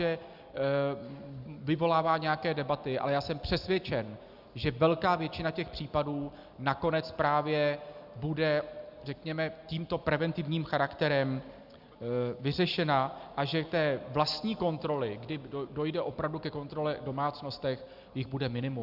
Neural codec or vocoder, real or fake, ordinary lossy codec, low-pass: none; real; Opus, 64 kbps; 5.4 kHz